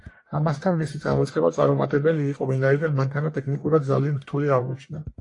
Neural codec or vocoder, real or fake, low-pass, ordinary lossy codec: codec, 44.1 kHz, 1.7 kbps, Pupu-Codec; fake; 10.8 kHz; AAC, 48 kbps